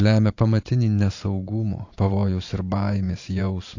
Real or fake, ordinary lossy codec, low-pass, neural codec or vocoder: fake; AAC, 48 kbps; 7.2 kHz; vocoder, 44.1 kHz, 128 mel bands every 512 samples, BigVGAN v2